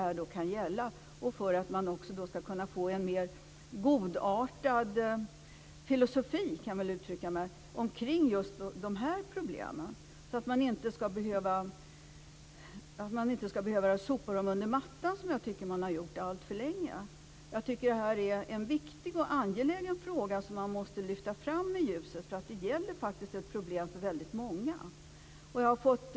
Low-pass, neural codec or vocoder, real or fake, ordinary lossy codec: none; none; real; none